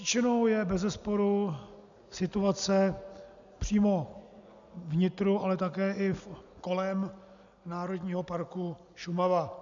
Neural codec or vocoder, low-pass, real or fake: none; 7.2 kHz; real